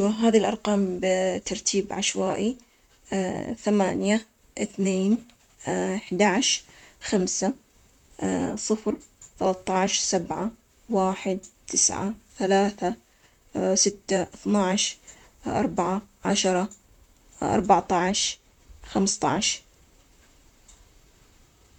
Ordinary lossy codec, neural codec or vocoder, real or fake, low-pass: none; vocoder, 44.1 kHz, 128 mel bands, Pupu-Vocoder; fake; 19.8 kHz